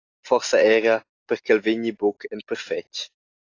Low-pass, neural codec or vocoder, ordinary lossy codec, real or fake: 7.2 kHz; none; AAC, 32 kbps; real